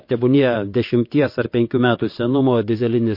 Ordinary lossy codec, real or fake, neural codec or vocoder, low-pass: MP3, 32 kbps; fake; vocoder, 22.05 kHz, 80 mel bands, WaveNeXt; 5.4 kHz